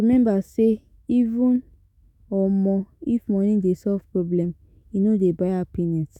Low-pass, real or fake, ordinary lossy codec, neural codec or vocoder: 19.8 kHz; fake; none; autoencoder, 48 kHz, 128 numbers a frame, DAC-VAE, trained on Japanese speech